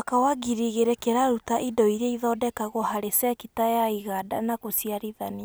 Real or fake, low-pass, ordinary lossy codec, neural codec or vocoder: real; none; none; none